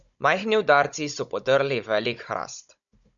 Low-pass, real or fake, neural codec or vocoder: 7.2 kHz; fake; codec, 16 kHz, 16 kbps, FunCodec, trained on Chinese and English, 50 frames a second